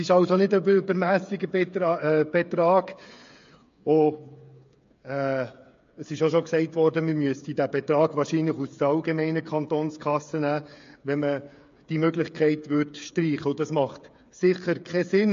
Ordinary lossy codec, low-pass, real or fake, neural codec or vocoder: MP3, 48 kbps; 7.2 kHz; fake; codec, 16 kHz, 8 kbps, FreqCodec, smaller model